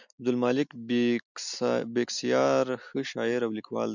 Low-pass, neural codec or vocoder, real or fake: 7.2 kHz; none; real